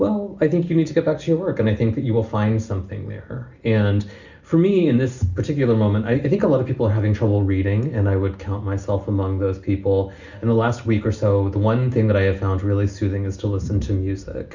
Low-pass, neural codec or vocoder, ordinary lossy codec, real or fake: 7.2 kHz; none; Opus, 64 kbps; real